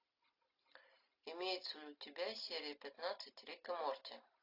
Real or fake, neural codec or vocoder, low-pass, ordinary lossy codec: real; none; 5.4 kHz; AAC, 32 kbps